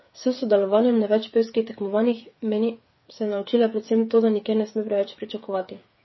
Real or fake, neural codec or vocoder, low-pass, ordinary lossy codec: fake; codec, 16 kHz, 8 kbps, FreqCodec, smaller model; 7.2 kHz; MP3, 24 kbps